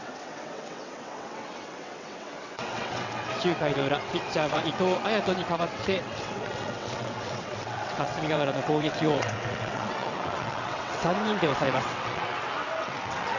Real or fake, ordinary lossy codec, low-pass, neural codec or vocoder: fake; none; 7.2 kHz; vocoder, 22.05 kHz, 80 mel bands, WaveNeXt